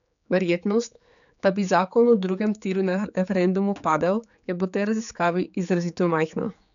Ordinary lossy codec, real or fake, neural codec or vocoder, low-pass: none; fake; codec, 16 kHz, 4 kbps, X-Codec, HuBERT features, trained on general audio; 7.2 kHz